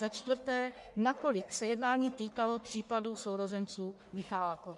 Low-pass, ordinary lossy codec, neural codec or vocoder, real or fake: 10.8 kHz; MP3, 64 kbps; codec, 44.1 kHz, 1.7 kbps, Pupu-Codec; fake